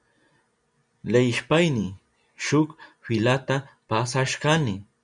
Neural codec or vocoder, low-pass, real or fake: none; 9.9 kHz; real